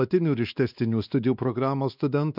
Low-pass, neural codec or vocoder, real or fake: 5.4 kHz; codec, 16 kHz, 2 kbps, X-Codec, WavLM features, trained on Multilingual LibriSpeech; fake